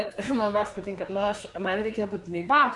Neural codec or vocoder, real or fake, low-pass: codec, 24 kHz, 1 kbps, SNAC; fake; 10.8 kHz